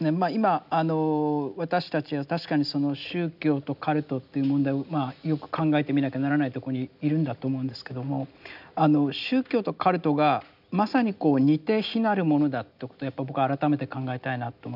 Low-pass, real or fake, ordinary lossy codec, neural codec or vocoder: 5.4 kHz; real; none; none